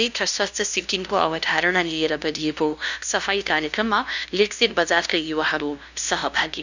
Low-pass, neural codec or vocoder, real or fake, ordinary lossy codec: 7.2 kHz; codec, 16 kHz, 0.5 kbps, FunCodec, trained on LibriTTS, 25 frames a second; fake; none